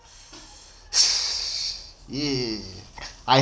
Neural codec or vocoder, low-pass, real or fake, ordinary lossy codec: none; none; real; none